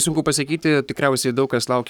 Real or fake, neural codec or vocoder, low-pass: fake; codec, 44.1 kHz, 7.8 kbps, Pupu-Codec; 19.8 kHz